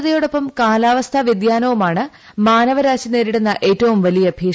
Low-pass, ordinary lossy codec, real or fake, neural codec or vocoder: none; none; real; none